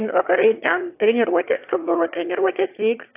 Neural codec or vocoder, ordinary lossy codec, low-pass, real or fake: autoencoder, 22.05 kHz, a latent of 192 numbers a frame, VITS, trained on one speaker; AAC, 32 kbps; 3.6 kHz; fake